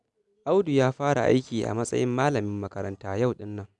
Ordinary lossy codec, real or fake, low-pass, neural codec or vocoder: none; real; 10.8 kHz; none